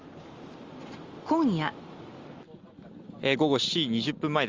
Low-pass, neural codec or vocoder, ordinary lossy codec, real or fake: 7.2 kHz; none; Opus, 32 kbps; real